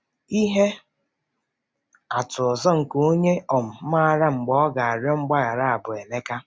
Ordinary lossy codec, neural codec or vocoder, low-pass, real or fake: none; none; none; real